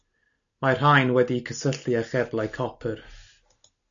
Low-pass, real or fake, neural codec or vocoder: 7.2 kHz; real; none